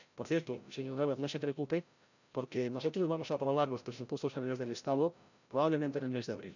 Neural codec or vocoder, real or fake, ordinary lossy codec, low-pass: codec, 16 kHz, 0.5 kbps, FreqCodec, larger model; fake; none; 7.2 kHz